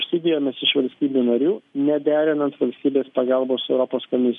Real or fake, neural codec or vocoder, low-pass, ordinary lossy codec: real; none; 10.8 kHz; MP3, 96 kbps